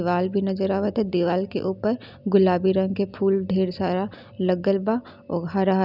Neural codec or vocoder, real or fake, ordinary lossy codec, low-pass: none; real; none; 5.4 kHz